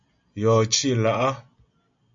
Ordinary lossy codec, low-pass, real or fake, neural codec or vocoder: MP3, 48 kbps; 7.2 kHz; real; none